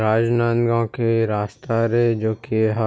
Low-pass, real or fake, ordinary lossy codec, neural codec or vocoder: none; real; none; none